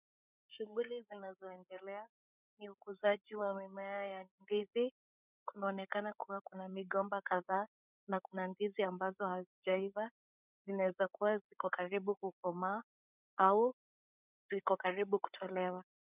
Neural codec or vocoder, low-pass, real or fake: codec, 16 kHz, 8 kbps, FreqCodec, larger model; 3.6 kHz; fake